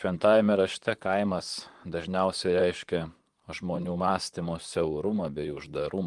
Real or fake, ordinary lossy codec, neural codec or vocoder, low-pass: fake; Opus, 24 kbps; vocoder, 44.1 kHz, 128 mel bands, Pupu-Vocoder; 10.8 kHz